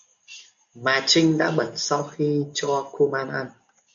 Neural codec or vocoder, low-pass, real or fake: none; 7.2 kHz; real